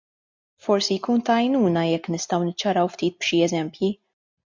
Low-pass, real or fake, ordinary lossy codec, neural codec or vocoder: 7.2 kHz; real; MP3, 64 kbps; none